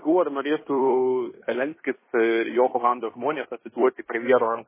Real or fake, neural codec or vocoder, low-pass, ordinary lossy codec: fake; codec, 16 kHz in and 24 kHz out, 0.9 kbps, LongCat-Audio-Codec, fine tuned four codebook decoder; 3.6 kHz; MP3, 16 kbps